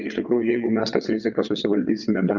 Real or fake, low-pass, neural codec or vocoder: fake; 7.2 kHz; vocoder, 22.05 kHz, 80 mel bands, WaveNeXt